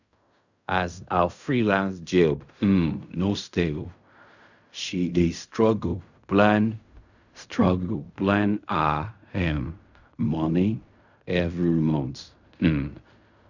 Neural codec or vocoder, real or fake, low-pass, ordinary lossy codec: codec, 16 kHz in and 24 kHz out, 0.4 kbps, LongCat-Audio-Codec, fine tuned four codebook decoder; fake; 7.2 kHz; none